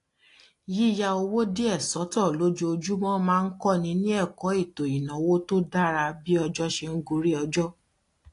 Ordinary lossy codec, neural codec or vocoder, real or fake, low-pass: AAC, 48 kbps; none; real; 10.8 kHz